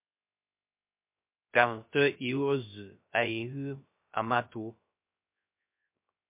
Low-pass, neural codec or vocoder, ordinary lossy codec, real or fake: 3.6 kHz; codec, 16 kHz, 0.3 kbps, FocalCodec; MP3, 32 kbps; fake